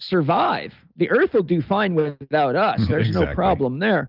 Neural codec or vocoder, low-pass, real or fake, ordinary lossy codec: none; 5.4 kHz; real; Opus, 24 kbps